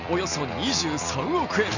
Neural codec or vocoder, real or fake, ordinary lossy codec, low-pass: none; real; none; 7.2 kHz